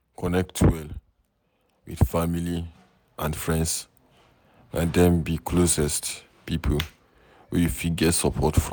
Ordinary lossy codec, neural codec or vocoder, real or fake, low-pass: none; none; real; none